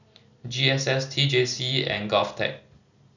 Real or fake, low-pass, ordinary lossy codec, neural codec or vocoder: real; 7.2 kHz; none; none